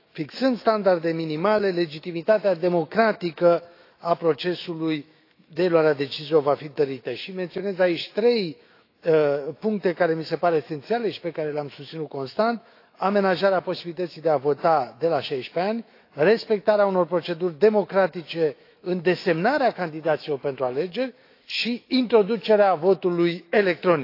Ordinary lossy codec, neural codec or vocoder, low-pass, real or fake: AAC, 32 kbps; autoencoder, 48 kHz, 128 numbers a frame, DAC-VAE, trained on Japanese speech; 5.4 kHz; fake